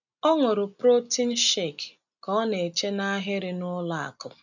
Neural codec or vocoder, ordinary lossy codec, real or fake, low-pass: none; none; real; 7.2 kHz